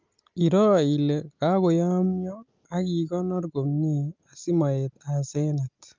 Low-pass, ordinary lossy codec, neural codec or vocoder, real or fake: 7.2 kHz; Opus, 24 kbps; none; real